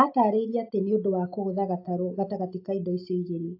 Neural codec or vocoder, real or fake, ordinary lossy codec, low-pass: none; real; AAC, 48 kbps; 5.4 kHz